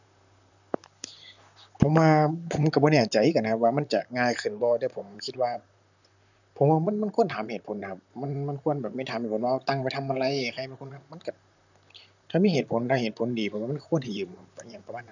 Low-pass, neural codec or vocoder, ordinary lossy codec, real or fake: 7.2 kHz; vocoder, 44.1 kHz, 128 mel bands, Pupu-Vocoder; none; fake